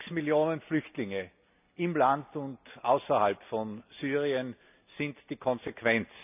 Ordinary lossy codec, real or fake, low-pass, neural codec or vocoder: none; real; 3.6 kHz; none